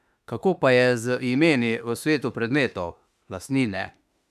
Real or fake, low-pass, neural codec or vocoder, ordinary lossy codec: fake; 14.4 kHz; autoencoder, 48 kHz, 32 numbers a frame, DAC-VAE, trained on Japanese speech; none